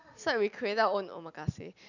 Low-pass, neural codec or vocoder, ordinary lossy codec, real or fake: 7.2 kHz; none; none; real